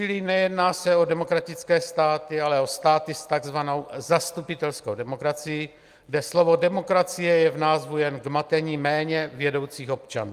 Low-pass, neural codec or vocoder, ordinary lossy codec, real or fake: 14.4 kHz; none; Opus, 32 kbps; real